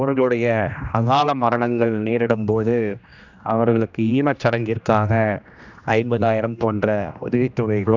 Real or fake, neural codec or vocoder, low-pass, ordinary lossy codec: fake; codec, 16 kHz, 1 kbps, X-Codec, HuBERT features, trained on general audio; 7.2 kHz; none